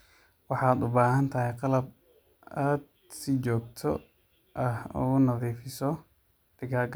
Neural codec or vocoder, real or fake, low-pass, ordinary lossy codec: none; real; none; none